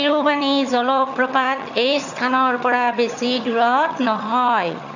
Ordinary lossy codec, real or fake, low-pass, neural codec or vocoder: none; fake; 7.2 kHz; vocoder, 22.05 kHz, 80 mel bands, HiFi-GAN